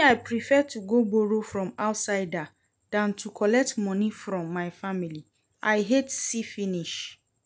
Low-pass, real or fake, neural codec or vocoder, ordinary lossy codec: none; real; none; none